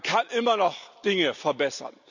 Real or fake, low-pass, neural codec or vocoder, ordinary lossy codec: real; 7.2 kHz; none; none